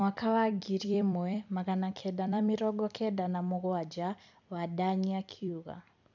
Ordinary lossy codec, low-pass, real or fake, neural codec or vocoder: none; 7.2 kHz; fake; vocoder, 44.1 kHz, 128 mel bands every 256 samples, BigVGAN v2